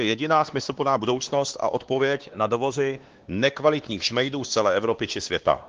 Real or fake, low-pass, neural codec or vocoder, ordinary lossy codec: fake; 7.2 kHz; codec, 16 kHz, 2 kbps, X-Codec, WavLM features, trained on Multilingual LibriSpeech; Opus, 16 kbps